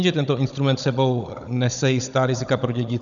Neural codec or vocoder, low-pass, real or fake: codec, 16 kHz, 16 kbps, FunCodec, trained on Chinese and English, 50 frames a second; 7.2 kHz; fake